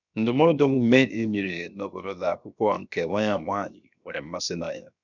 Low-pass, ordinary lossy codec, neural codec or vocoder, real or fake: 7.2 kHz; none; codec, 16 kHz, 0.7 kbps, FocalCodec; fake